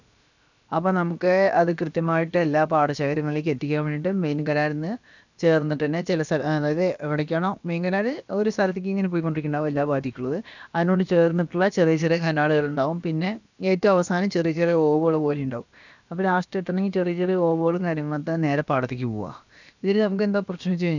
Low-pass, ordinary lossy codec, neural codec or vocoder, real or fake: 7.2 kHz; none; codec, 16 kHz, about 1 kbps, DyCAST, with the encoder's durations; fake